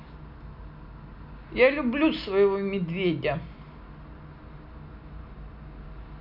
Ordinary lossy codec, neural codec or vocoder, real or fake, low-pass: none; none; real; 5.4 kHz